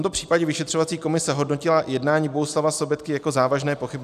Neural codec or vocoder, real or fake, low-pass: none; real; 14.4 kHz